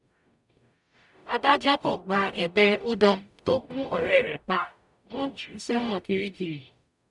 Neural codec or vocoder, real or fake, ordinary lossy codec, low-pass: codec, 44.1 kHz, 0.9 kbps, DAC; fake; none; 10.8 kHz